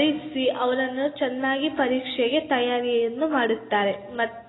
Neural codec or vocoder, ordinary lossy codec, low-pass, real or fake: none; AAC, 16 kbps; 7.2 kHz; real